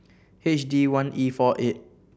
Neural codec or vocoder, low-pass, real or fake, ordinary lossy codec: none; none; real; none